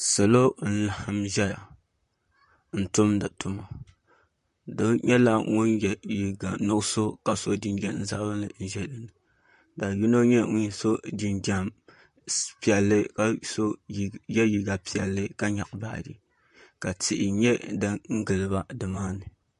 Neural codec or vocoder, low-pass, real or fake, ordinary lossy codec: vocoder, 44.1 kHz, 128 mel bands, Pupu-Vocoder; 14.4 kHz; fake; MP3, 48 kbps